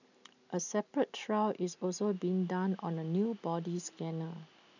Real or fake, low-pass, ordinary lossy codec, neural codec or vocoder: real; 7.2 kHz; none; none